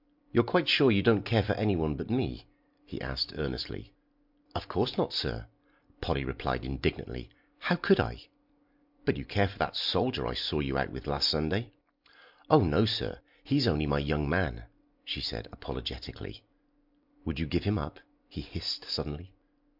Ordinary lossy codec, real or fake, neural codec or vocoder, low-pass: MP3, 48 kbps; real; none; 5.4 kHz